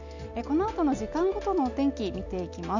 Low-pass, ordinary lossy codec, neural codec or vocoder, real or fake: 7.2 kHz; none; none; real